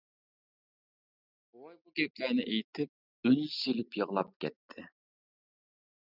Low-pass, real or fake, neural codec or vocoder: 5.4 kHz; real; none